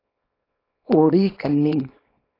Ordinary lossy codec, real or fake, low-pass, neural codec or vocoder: AAC, 24 kbps; fake; 5.4 kHz; codec, 24 kHz, 0.9 kbps, WavTokenizer, small release